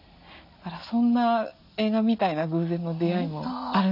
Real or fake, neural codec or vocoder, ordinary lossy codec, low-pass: real; none; none; 5.4 kHz